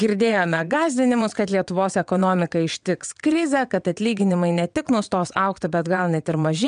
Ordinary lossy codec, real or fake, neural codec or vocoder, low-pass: MP3, 96 kbps; fake; vocoder, 22.05 kHz, 80 mel bands, WaveNeXt; 9.9 kHz